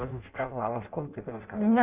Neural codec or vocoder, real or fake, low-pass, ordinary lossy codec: codec, 16 kHz in and 24 kHz out, 0.6 kbps, FireRedTTS-2 codec; fake; 3.6 kHz; Opus, 64 kbps